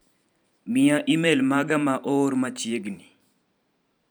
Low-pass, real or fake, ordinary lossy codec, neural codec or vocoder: none; fake; none; vocoder, 44.1 kHz, 128 mel bands every 512 samples, BigVGAN v2